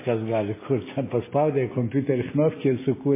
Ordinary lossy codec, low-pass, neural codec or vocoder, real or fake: MP3, 16 kbps; 3.6 kHz; none; real